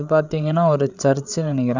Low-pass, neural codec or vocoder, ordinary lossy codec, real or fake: 7.2 kHz; codec, 16 kHz, 8 kbps, FreqCodec, larger model; none; fake